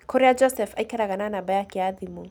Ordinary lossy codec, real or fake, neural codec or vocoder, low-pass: none; real; none; 19.8 kHz